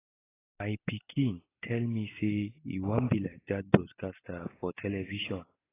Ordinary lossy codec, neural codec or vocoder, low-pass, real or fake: AAC, 16 kbps; none; 3.6 kHz; real